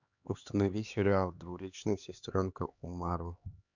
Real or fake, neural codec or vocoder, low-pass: fake; codec, 16 kHz, 2 kbps, X-Codec, HuBERT features, trained on LibriSpeech; 7.2 kHz